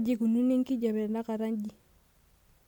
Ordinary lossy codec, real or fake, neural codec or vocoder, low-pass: MP3, 96 kbps; real; none; 19.8 kHz